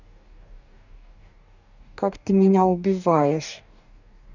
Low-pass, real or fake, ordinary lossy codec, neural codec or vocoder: 7.2 kHz; fake; none; codec, 44.1 kHz, 2.6 kbps, DAC